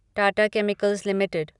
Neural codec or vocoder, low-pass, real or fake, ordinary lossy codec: none; 10.8 kHz; real; none